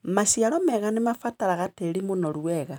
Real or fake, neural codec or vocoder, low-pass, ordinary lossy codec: fake; vocoder, 44.1 kHz, 128 mel bands every 512 samples, BigVGAN v2; none; none